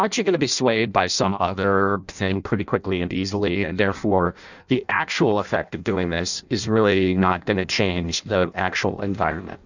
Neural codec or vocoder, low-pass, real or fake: codec, 16 kHz in and 24 kHz out, 0.6 kbps, FireRedTTS-2 codec; 7.2 kHz; fake